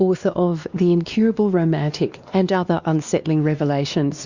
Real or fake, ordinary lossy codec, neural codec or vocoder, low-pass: fake; Opus, 64 kbps; codec, 16 kHz, 2 kbps, X-Codec, WavLM features, trained on Multilingual LibriSpeech; 7.2 kHz